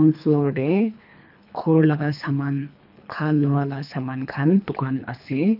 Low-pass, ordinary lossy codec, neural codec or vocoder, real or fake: 5.4 kHz; none; codec, 24 kHz, 3 kbps, HILCodec; fake